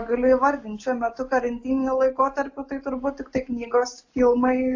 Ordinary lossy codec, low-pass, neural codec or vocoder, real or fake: AAC, 48 kbps; 7.2 kHz; none; real